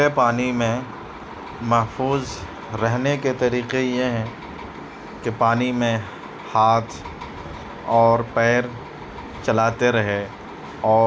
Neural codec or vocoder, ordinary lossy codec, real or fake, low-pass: none; none; real; none